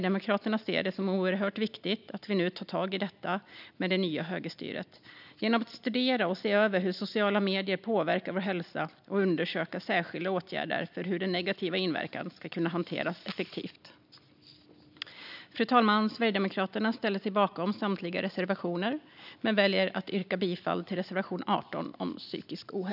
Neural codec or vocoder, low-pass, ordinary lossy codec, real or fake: none; 5.4 kHz; none; real